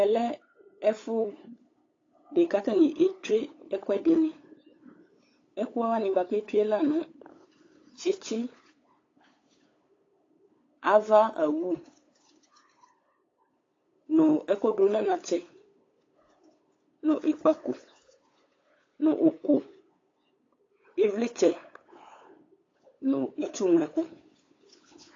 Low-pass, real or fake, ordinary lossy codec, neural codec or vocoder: 7.2 kHz; fake; AAC, 32 kbps; codec, 16 kHz, 8 kbps, FunCodec, trained on LibriTTS, 25 frames a second